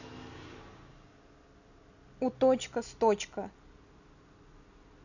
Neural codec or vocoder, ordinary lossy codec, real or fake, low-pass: none; none; real; 7.2 kHz